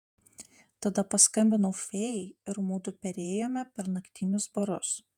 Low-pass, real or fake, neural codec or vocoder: 19.8 kHz; fake; vocoder, 48 kHz, 128 mel bands, Vocos